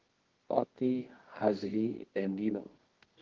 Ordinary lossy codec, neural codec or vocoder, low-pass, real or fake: Opus, 16 kbps; codec, 24 kHz, 0.9 kbps, WavTokenizer, medium music audio release; 7.2 kHz; fake